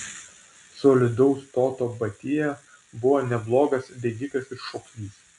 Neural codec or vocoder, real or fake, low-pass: none; real; 10.8 kHz